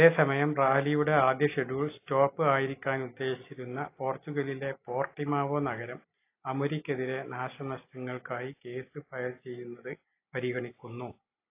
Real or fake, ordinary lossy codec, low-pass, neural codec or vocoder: real; AAC, 24 kbps; 3.6 kHz; none